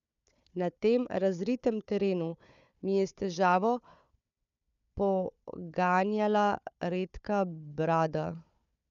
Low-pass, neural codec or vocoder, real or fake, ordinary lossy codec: 7.2 kHz; codec, 16 kHz, 4 kbps, FunCodec, trained on Chinese and English, 50 frames a second; fake; none